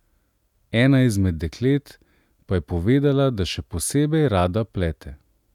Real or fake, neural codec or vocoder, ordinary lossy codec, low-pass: real; none; none; 19.8 kHz